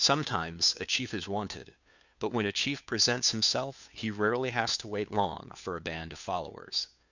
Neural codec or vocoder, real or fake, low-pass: codec, 16 kHz, 2 kbps, FunCodec, trained on Chinese and English, 25 frames a second; fake; 7.2 kHz